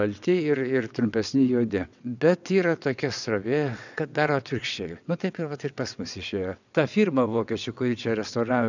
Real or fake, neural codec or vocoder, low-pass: fake; vocoder, 22.05 kHz, 80 mel bands, WaveNeXt; 7.2 kHz